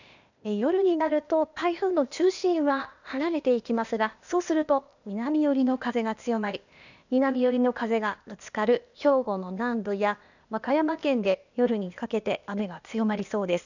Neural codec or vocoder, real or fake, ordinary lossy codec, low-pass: codec, 16 kHz, 0.8 kbps, ZipCodec; fake; none; 7.2 kHz